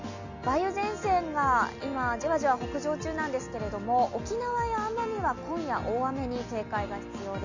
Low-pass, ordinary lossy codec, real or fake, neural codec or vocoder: 7.2 kHz; none; real; none